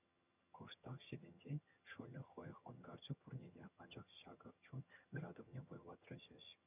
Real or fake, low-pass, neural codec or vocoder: fake; 3.6 kHz; vocoder, 22.05 kHz, 80 mel bands, HiFi-GAN